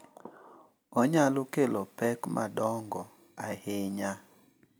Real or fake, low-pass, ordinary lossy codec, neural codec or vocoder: real; none; none; none